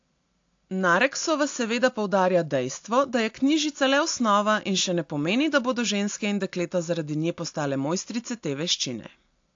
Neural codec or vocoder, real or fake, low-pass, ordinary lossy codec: none; real; 7.2 kHz; AAC, 48 kbps